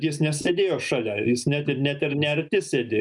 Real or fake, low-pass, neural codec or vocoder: real; 10.8 kHz; none